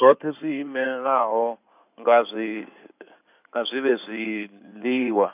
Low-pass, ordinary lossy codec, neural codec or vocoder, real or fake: 3.6 kHz; none; codec, 16 kHz in and 24 kHz out, 2.2 kbps, FireRedTTS-2 codec; fake